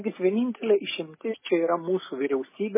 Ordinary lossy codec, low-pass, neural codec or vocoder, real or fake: MP3, 16 kbps; 3.6 kHz; none; real